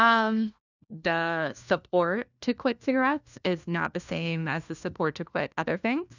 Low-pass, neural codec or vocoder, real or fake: 7.2 kHz; codec, 16 kHz, 1 kbps, FunCodec, trained on LibriTTS, 50 frames a second; fake